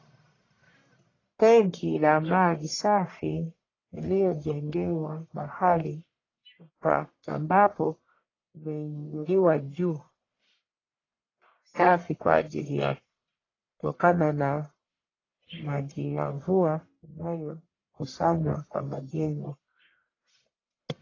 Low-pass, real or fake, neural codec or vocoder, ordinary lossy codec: 7.2 kHz; fake; codec, 44.1 kHz, 1.7 kbps, Pupu-Codec; AAC, 32 kbps